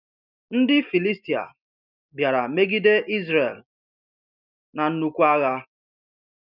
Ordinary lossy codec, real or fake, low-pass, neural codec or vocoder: none; real; 5.4 kHz; none